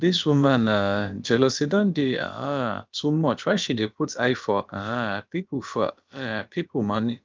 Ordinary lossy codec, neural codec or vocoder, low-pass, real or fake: Opus, 24 kbps; codec, 16 kHz, about 1 kbps, DyCAST, with the encoder's durations; 7.2 kHz; fake